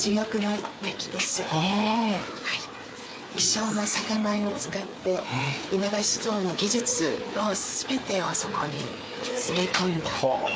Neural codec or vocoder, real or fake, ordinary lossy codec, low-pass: codec, 16 kHz, 4 kbps, FreqCodec, larger model; fake; none; none